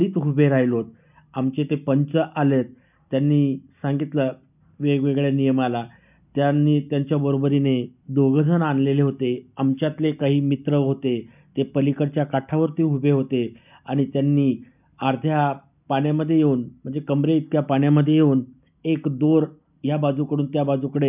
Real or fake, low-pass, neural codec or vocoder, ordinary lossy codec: real; 3.6 kHz; none; none